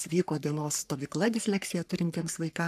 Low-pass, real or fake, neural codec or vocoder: 14.4 kHz; fake; codec, 44.1 kHz, 3.4 kbps, Pupu-Codec